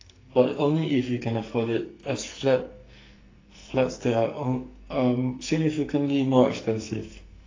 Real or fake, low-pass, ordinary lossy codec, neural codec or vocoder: fake; 7.2 kHz; AAC, 32 kbps; codec, 44.1 kHz, 2.6 kbps, SNAC